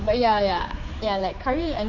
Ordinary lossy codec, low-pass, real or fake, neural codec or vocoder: none; 7.2 kHz; fake; codec, 16 kHz, 4 kbps, X-Codec, HuBERT features, trained on general audio